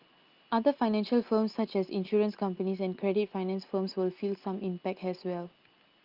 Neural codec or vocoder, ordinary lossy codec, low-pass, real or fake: none; Opus, 32 kbps; 5.4 kHz; real